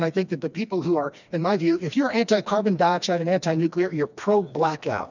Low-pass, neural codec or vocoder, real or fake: 7.2 kHz; codec, 16 kHz, 2 kbps, FreqCodec, smaller model; fake